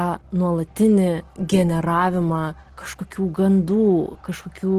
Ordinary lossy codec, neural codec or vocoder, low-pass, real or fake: Opus, 16 kbps; none; 14.4 kHz; real